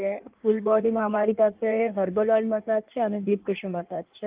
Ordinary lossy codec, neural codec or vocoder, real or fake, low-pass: Opus, 32 kbps; codec, 16 kHz in and 24 kHz out, 1.1 kbps, FireRedTTS-2 codec; fake; 3.6 kHz